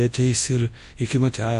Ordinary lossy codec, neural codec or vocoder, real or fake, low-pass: MP3, 48 kbps; codec, 24 kHz, 0.9 kbps, WavTokenizer, large speech release; fake; 10.8 kHz